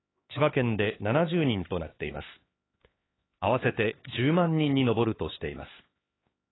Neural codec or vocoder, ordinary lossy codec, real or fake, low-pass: codec, 16 kHz, 4 kbps, X-Codec, HuBERT features, trained on LibriSpeech; AAC, 16 kbps; fake; 7.2 kHz